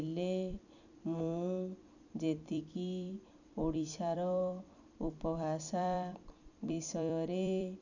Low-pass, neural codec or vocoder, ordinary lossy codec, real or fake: 7.2 kHz; vocoder, 44.1 kHz, 128 mel bands every 256 samples, BigVGAN v2; none; fake